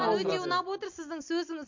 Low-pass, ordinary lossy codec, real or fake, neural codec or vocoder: 7.2 kHz; MP3, 48 kbps; real; none